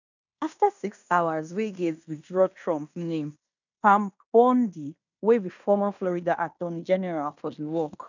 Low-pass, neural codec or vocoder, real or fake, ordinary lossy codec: 7.2 kHz; codec, 16 kHz in and 24 kHz out, 0.9 kbps, LongCat-Audio-Codec, fine tuned four codebook decoder; fake; none